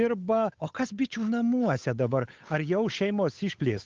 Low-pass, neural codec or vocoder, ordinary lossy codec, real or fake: 7.2 kHz; codec, 16 kHz, 8 kbps, FunCodec, trained on Chinese and English, 25 frames a second; Opus, 24 kbps; fake